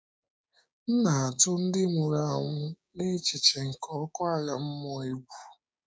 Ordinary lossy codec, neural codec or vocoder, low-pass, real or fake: none; codec, 16 kHz, 6 kbps, DAC; none; fake